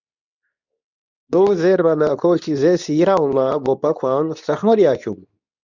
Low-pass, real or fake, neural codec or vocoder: 7.2 kHz; fake; codec, 24 kHz, 0.9 kbps, WavTokenizer, medium speech release version 2